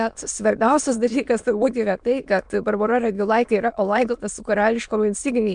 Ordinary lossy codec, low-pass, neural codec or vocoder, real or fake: MP3, 96 kbps; 9.9 kHz; autoencoder, 22.05 kHz, a latent of 192 numbers a frame, VITS, trained on many speakers; fake